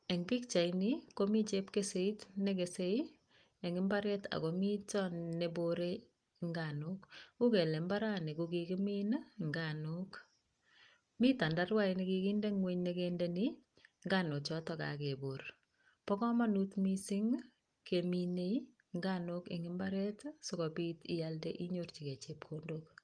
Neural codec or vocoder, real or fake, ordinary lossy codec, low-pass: none; real; Opus, 32 kbps; 9.9 kHz